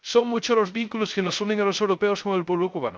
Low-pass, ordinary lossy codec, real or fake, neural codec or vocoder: none; none; fake; codec, 16 kHz, 0.3 kbps, FocalCodec